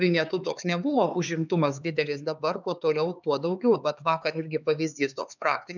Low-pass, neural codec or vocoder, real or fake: 7.2 kHz; codec, 16 kHz, 4 kbps, X-Codec, HuBERT features, trained on LibriSpeech; fake